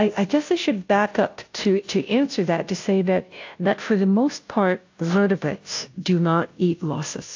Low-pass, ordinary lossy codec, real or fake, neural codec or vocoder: 7.2 kHz; AAC, 48 kbps; fake; codec, 16 kHz, 0.5 kbps, FunCodec, trained on Chinese and English, 25 frames a second